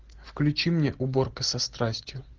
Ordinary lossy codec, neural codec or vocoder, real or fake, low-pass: Opus, 16 kbps; none; real; 7.2 kHz